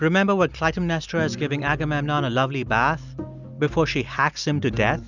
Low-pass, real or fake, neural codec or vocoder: 7.2 kHz; real; none